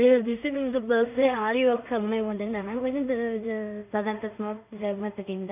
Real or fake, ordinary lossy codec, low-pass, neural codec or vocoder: fake; none; 3.6 kHz; codec, 16 kHz in and 24 kHz out, 0.4 kbps, LongCat-Audio-Codec, two codebook decoder